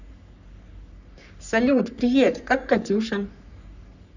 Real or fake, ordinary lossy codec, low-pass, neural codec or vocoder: fake; none; 7.2 kHz; codec, 44.1 kHz, 3.4 kbps, Pupu-Codec